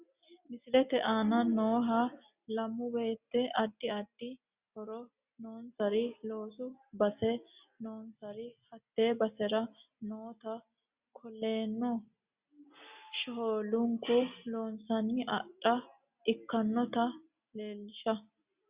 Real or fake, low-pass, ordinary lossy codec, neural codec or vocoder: real; 3.6 kHz; Opus, 64 kbps; none